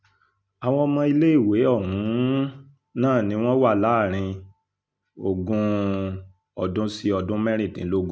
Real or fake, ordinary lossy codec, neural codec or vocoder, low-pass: real; none; none; none